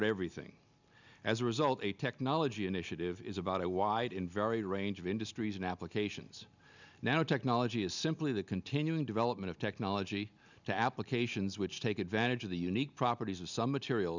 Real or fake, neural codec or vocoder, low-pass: real; none; 7.2 kHz